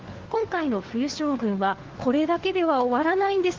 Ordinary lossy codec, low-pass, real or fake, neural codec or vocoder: Opus, 16 kbps; 7.2 kHz; fake; codec, 16 kHz, 4 kbps, FunCodec, trained on LibriTTS, 50 frames a second